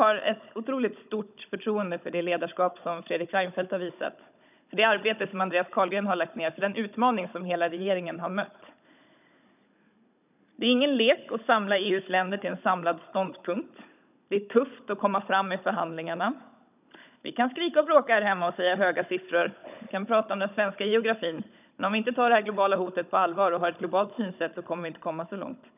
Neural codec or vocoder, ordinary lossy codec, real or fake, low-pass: codec, 16 kHz, 16 kbps, FunCodec, trained on Chinese and English, 50 frames a second; none; fake; 3.6 kHz